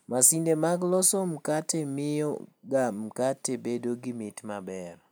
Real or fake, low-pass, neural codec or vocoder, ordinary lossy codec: real; none; none; none